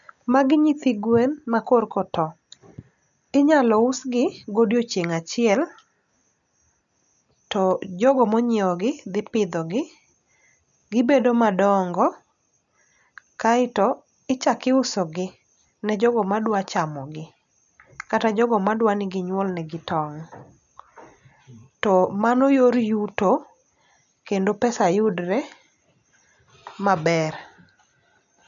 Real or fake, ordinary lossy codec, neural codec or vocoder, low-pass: real; none; none; 7.2 kHz